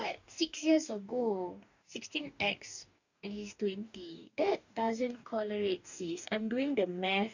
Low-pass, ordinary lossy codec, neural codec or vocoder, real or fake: 7.2 kHz; none; codec, 44.1 kHz, 2.6 kbps, DAC; fake